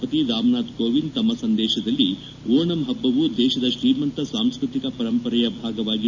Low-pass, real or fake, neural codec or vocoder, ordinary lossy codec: 7.2 kHz; real; none; none